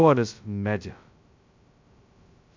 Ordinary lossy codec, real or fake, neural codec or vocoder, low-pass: MP3, 64 kbps; fake; codec, 16 kHz, 0.2 kbps, FocalCodec; 7.2 kHz